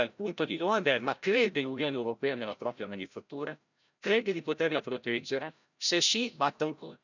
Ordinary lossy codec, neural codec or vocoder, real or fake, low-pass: none; codec, 16 kHz, 0.5 kbps, FreqCodec, larger model; fake; 7.2 kHz